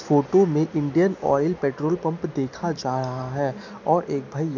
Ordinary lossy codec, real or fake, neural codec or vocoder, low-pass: none; real; none; 7.2 kHz